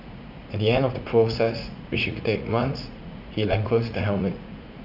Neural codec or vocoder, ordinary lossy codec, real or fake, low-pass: vocoder, 44.1 kHz, 80 mel bands, Vocos; none; fake; 5.4 kHz